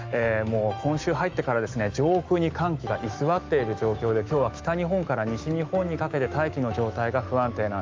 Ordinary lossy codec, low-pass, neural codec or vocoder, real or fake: Opus, 32 kbps; 7.2 kHz; autoencoder, 48 kHz, 128 numbers a frame, DAC-VAE, trained on Japanese speech; fake